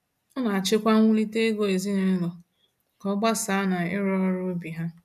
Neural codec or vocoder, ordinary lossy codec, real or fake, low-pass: none; none; real; 14.4 kHz